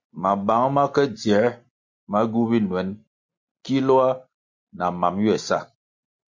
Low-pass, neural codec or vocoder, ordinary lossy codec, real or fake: 7.2 kHz; none; MP3, 48 kbps; real